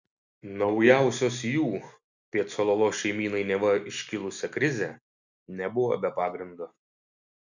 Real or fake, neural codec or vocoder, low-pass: real; none; 7.2 kHz